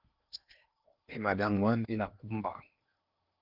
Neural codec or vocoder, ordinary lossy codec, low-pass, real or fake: codec, 16 kHz in and 24 kHz out, 0.8 kbps, FocalCodec, streaming, 65536 codes; Opus, 32 kbps; 5.4 kHz; fake